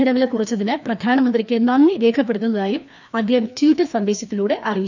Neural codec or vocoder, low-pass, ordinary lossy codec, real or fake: codec, 16 kHz, 2 kbps, FreqCodec, larger model; 7.2 kHz; none; fake